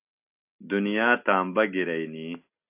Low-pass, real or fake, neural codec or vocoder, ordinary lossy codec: 3.6 kHz; real; none; AAC, 32 kbps